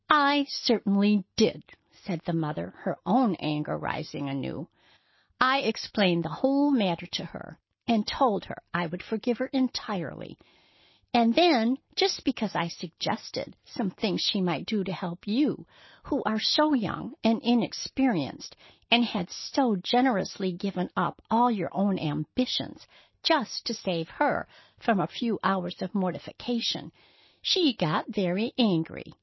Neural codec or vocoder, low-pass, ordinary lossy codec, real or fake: none; 7.2 kHz; MP3, 24 kbps; real